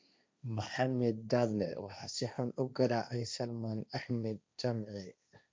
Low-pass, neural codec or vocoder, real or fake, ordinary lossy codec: none; codec, 16 kHz, 1.1 kbps, Voila-Tokenizer; fake; none